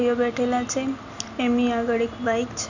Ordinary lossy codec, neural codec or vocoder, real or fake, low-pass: none; none; real; 7.2 kHz